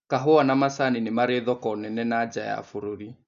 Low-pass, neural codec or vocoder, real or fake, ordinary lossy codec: 7.2 kHz; none; real; none